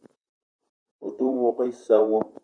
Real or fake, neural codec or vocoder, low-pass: fake; vocoder, 44.1 kHz, 128 mel bands, Pupu-Vocoder; 9.9 kHz